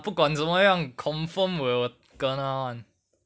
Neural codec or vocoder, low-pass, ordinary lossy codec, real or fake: none; none; none; real